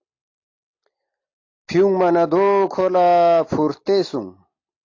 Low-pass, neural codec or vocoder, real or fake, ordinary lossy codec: 7.2 kHz; none; real; AAC, 48 kbps